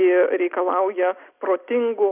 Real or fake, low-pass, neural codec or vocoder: real; 3.6 kHz; none